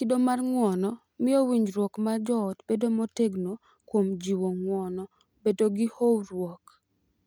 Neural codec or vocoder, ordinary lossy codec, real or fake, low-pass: none; none; real; none